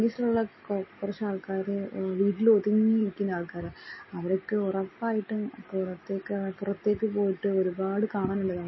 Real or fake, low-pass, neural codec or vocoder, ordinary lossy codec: real; 7.2 kHz; none; MP3, 24 kbps